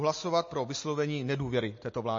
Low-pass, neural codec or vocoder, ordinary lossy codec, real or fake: 7.2 kHz; none; MP3, 32 kbps; real